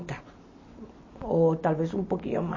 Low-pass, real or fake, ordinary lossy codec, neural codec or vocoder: 7.2 kHz; real; MP3, 32 kbps; none